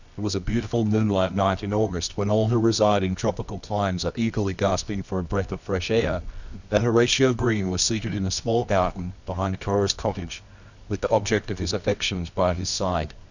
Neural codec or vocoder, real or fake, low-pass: codec, 24 kHz, 0.9 kbps, WavTokenizer, medium music audio release; fake; 7.2 kHz